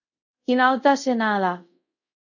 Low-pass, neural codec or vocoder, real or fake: 7.2 kHz; codec, 24 kHz, 0.5 kbps, DualCodec; fake